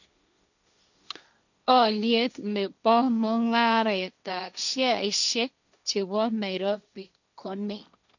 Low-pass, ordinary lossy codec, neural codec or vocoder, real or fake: 7.2 kHz; none; codec, 16 kHz, 1.1 kbps, Voila-Tokenizer; fake